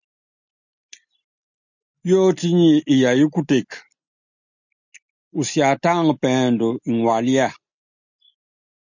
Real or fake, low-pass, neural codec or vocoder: real; 7.2 kHz; none